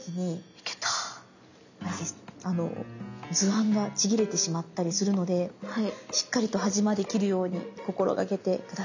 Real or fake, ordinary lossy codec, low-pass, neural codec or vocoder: real; none; 7.2 kHz; none